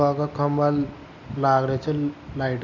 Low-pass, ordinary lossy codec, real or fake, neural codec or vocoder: 7.2 kHz; none; real; none